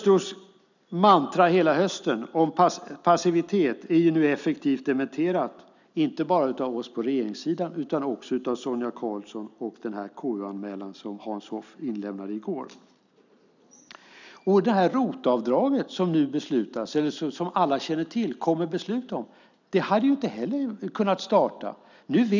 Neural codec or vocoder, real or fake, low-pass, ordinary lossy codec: none; real; 7.2 kHz; none